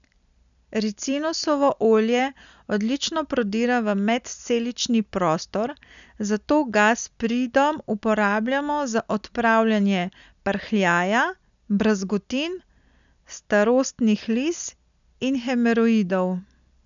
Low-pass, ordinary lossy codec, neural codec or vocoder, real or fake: 7.2 kHz; none; none; real